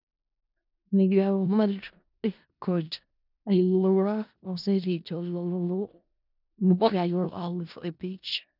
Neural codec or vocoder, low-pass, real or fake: codec, 16 kHz in and 24 kHz out, 0.4 kbps, LongCat-Audio-Codec, four codebook decoder; 5.4 kHz; fake